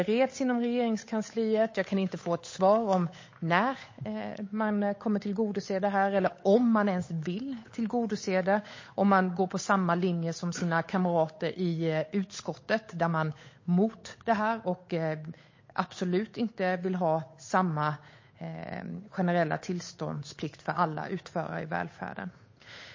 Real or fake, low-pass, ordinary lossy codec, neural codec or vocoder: fake; 7.2 kHz; MP3, 32 kbps; codec, 16 kHz, 8 kbps, FunCodec, trained on Chinese and English, 25 frames a second